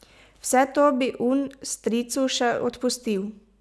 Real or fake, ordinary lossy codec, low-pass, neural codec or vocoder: real; none; none; none